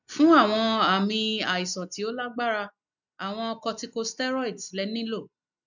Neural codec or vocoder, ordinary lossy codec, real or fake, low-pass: none; none; real; 7.2 kHz